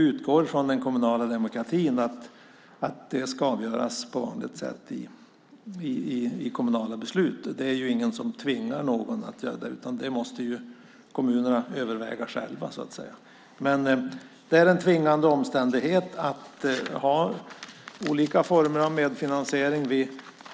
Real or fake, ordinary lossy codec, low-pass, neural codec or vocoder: real; none; none; none